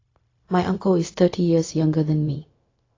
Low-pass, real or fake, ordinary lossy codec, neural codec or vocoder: 7.2 kHz; fake; AAC, 32 kbps; codec, 16 kHz, 0.4 kbps, LongCat-Audio-Codec